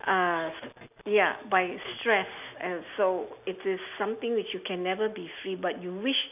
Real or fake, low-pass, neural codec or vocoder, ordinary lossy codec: real; 3.6 kHz; none; none